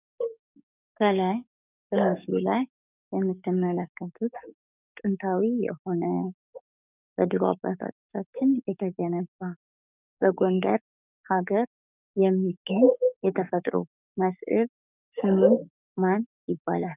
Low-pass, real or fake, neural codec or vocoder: 3.6 kHz; fake; codec, 44.1 kHz, 7.8 kbps, DAC